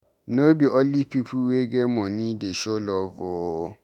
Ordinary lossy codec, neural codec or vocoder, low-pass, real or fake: none; autoencoder, 48 kHz, 32 numbers a frame, DAC-VAE, trained on Japanese speech; 19.8 kHz; fake